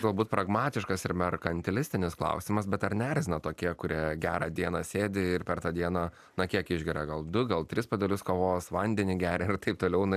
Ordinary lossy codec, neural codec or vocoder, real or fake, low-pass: AAC, 96 kbps; none; real; 14.4 kHz